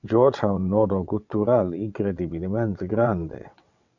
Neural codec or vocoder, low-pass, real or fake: vocoder, 22.05 kHz, 80 mel bands, WaveNeXt; 7.2 kHz; fake